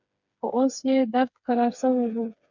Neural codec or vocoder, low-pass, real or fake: codec, 16 kHz, 4 kbps, FreqCodec, smaller model; 7.2 kHz; fake